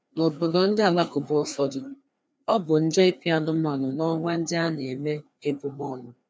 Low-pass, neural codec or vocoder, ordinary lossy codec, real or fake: none; codec, 16 kHz, 2 kbps, FreqCodec, larger model; none; fake